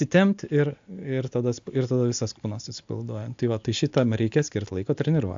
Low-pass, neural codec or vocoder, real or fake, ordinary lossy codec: 7.2 kHz; none; real; AAC, 64 kbps